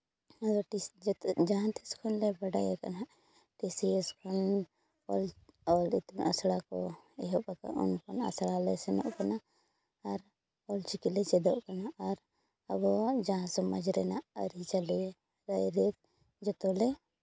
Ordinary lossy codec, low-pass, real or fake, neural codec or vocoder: none; none; real; none